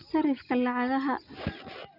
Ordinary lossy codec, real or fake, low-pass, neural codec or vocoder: none; real; 5.4 kHz; none